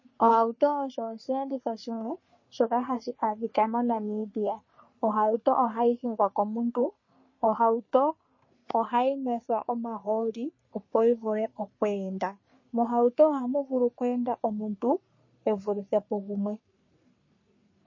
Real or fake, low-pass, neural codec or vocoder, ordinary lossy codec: fake; 7.2 kHz; codec, 44.1 kHz, 3.4 kbps, Pupu-Codec; MP3, 32 kbps